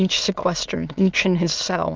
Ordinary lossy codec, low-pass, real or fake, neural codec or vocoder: Opus, 32 kbps; 7.2 kHz; fake; autoencoder, 22.05 kHz, a latent of 192 numbers a frame, VITS, trained on many speakers